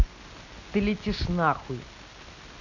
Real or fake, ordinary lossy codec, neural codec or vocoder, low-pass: real; none; none; 7.2 kHz